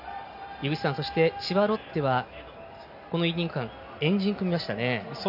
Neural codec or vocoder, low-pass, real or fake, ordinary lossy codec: none; 5.4 kHz; real; none